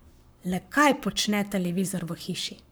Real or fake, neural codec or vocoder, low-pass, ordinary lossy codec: fake; codec, 44.1 kHz, 7.8 kbps, Pupu-Codec; none; none